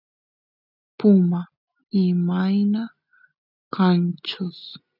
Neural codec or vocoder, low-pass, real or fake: none; 5.4 kHz; real